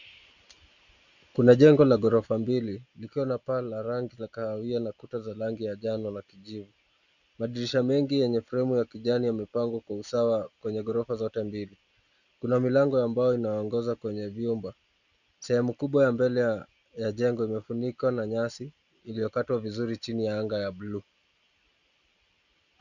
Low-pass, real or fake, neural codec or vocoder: 7.2 kHz; real; none